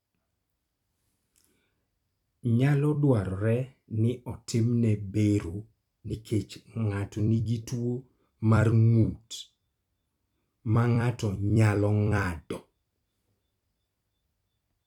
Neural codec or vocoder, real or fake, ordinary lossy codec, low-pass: vocoder, 44.1 kHz, 128 mel bands every 256 samples, BigVGAN v2; fake; none; 19.8 kHz